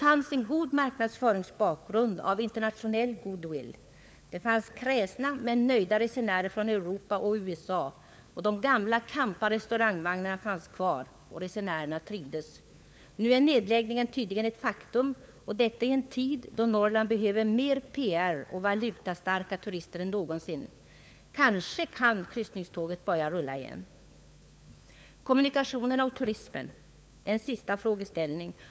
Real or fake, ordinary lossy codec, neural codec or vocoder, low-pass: fake; none; codec, 16 kHz, 4 kbps, FunCodec, trained on LibriTTS, 50 frames a second; none